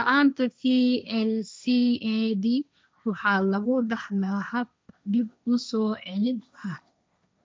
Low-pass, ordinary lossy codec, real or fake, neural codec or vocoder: none; none; fake; codec, 16 kHz, 1.1 kbps, Voila-Tokenizer